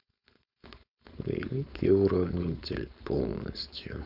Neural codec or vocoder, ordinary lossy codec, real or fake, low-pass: codec, 16 kHz, 4.8 kbps, FACodec; none; fake; 5.4 kHz